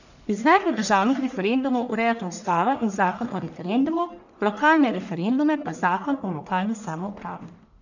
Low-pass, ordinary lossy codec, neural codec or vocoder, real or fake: 7.2 kHz; none; codec, 44.1 kHz, 1.7 kbps, Pupu-Codec; fake